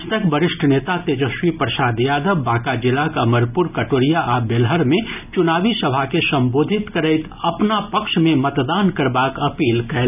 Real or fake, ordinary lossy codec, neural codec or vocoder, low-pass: real; none; none; 3.6 kHz